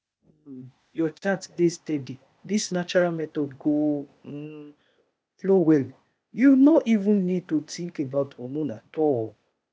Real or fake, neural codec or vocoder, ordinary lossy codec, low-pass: fake; codec, 16 kHz, 0.8 kbps, ZipCodec; none; none